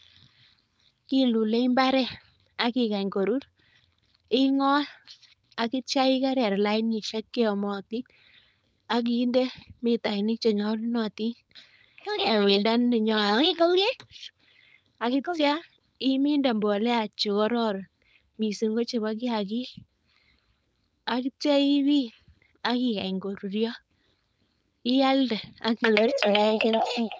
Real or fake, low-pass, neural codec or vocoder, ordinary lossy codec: fake; none; codec, 16 kHz, 4.8 kbps, FACodec; none